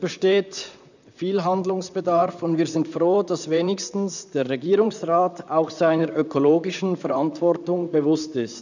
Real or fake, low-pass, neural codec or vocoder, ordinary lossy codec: fake; 7.2 kHz; vocoder, 44.1 kHz, 128 mel bands, Pupu-Vocoder; none